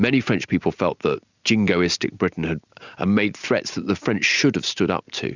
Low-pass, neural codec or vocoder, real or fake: 7.2 kHz; none; real